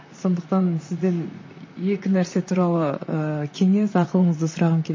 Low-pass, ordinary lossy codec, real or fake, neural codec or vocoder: 7.2 kHz; MP3, 32 kbps; fake; vocoder, 44.1 kHz, 128 mel bands, Pupu-Vocoder